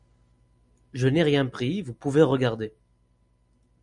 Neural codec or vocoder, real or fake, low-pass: none; real; 10.8 kHz